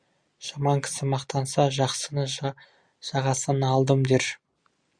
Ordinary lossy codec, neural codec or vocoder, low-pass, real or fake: Opus, 64 kbps; none; 9.9 kHz; real